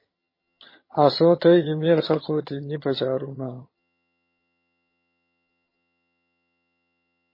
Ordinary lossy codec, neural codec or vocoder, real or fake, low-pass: MP3, 24 kbps; vocoder, 22.05 kHz, 80 mel bands, HiFi-GAN; fake; 5.4 kHz